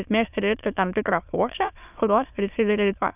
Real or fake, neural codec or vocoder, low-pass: fake; autoencoder, 22.05 kHz, a latent of 192 numbers a frame, VITS, trained on many speakers; 3.6 kHz